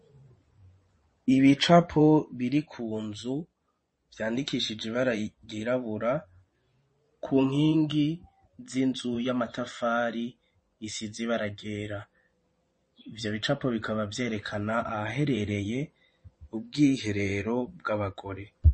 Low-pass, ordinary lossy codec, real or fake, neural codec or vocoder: 10.8 kHz; MP3, 32 kbps; fake; vocoder, 24 kHz, 100 mel bands, Vocos